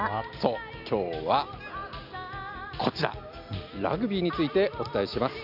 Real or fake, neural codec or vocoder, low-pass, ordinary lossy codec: real; none; 5.4 kHz; none